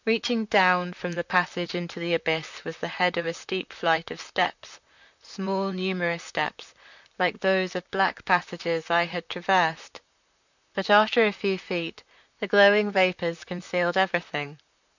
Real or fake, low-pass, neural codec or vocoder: fake; 7.2 kHz; vocoder, 44.1 kHz, 128 mel bands, Pupu-Vocoder